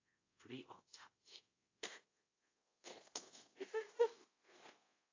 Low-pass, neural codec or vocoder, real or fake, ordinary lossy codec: 7.2 kHz; codec, 24 kHz, 0.5 kbps, DualCodec; fake; AAC, 32 kbps